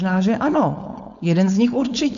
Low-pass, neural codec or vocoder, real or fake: 7.2 kHz; codec, 16 kHz, 4.8 kbps, FACodec; fake